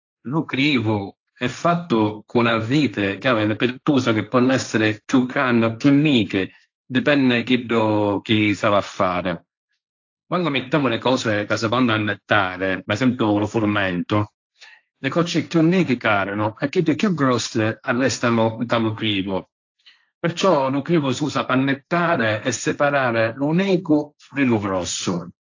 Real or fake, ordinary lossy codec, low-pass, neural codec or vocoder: fake; AAC, 48 kbps; 7.2 kHz; codec, 16 kHz, 1.1 kbps, Voila-Tokenizer